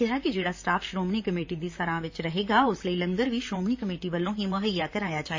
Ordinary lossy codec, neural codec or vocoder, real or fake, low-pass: MP3, 32 kbps; vocoder, 44.1 kHz, 128 mel bands, Pupu-Vocoder; fake; 7.2 kHz